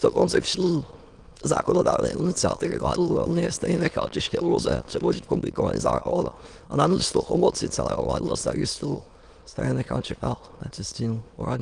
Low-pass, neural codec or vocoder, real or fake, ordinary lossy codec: 9.9 kHz; autoencoder, 22.05 kHz, a latent of 192 numbers a frame, VITS, trained on many speakers; fake; Opus, 24 kbps